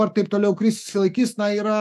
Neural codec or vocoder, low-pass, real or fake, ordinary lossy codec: autoencoder, 48 kHz, 128 numbers a frame, DAC-VAE, trained on Japanese speech; 14.4 kHz; fake; AAC, 96 kbps